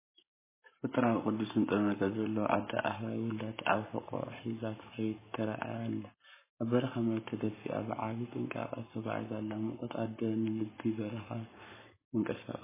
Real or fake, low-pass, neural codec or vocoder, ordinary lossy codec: fake; 3.6 kHz; codec, 44.1 kHz, 7.8 kbps, Pupu-Codec; MP3, 16 kbps